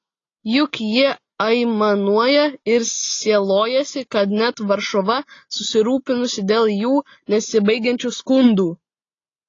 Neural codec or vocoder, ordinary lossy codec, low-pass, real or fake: none; AAC, 32 kbps; 7.2 kHz; real